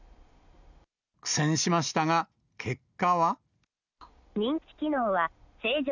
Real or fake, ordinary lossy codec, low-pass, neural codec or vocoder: real; none; 7.2 kHz; none